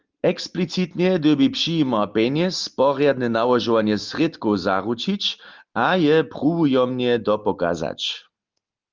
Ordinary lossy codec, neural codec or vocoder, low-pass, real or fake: Opus, 32 kbps; none; 7.2 kHz; real